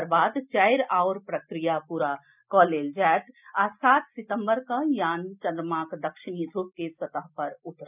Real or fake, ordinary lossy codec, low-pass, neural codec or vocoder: real; none; 3.6 kHz; none